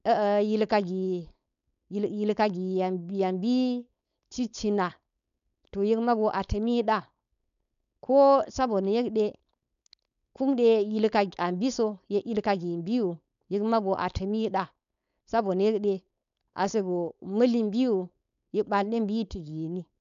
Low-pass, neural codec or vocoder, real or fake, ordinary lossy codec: 7.2 kHz; codec, 16 kHz, 4.8 kbps, FACodec; fake; none